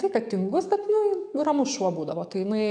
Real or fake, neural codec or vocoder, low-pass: fake; codec, 44.1 kHz, 7.8 kbps, DAC; 9.9 kHz